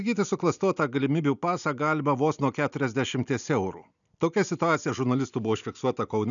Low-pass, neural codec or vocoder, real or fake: 7.2 kHz; none; real